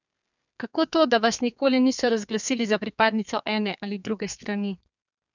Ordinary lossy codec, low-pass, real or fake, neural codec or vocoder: none; 7.2 kHz; fake; codec, 32 kHz, 1.9 kbps, SNAC